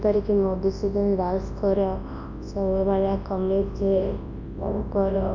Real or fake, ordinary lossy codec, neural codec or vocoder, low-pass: fake; Opus, 64 kbps; codec, 24 kHz, 0.9 kbps, WavTokenizer, large speech release; 7.2 kHz